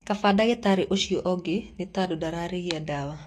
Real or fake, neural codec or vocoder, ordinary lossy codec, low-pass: fake; autoencoder, 48 kHz, 128 numbers a frame, DAC-VAE, trained on Japanese speech; AAC, 48 kbps; 14.4 kHz